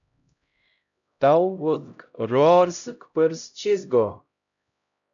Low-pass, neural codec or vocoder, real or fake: 7.2 kHz; codec, 16 kHz, 0.5 kbps, X-Codec, HuBERT features, trained on LibriSpeech; fake